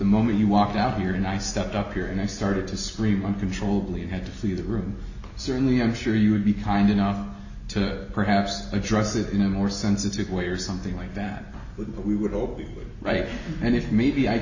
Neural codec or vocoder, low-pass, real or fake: none; 7.2 kHz; real